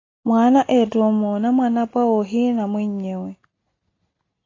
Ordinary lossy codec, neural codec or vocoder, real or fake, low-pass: AAC, 32 kbps; none; real; 7.2 kHz